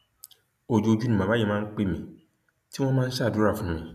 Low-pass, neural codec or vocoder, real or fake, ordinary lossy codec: 14.4 kHz; none; real; none